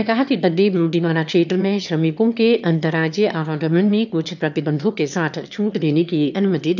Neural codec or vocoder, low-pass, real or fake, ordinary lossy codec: autoencoder, 22.05 kHz, a latent of 192 numbers a frame, VITS, trained on one speaker; 7.2 kHz; fake; none